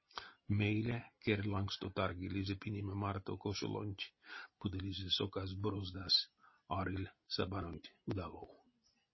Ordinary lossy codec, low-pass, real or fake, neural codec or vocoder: MP3, 24 kbps; 7.2 kHz; real; none